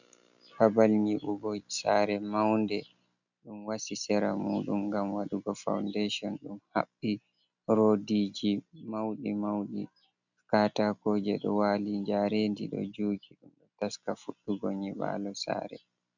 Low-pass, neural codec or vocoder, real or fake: 7.2 kHz; none; real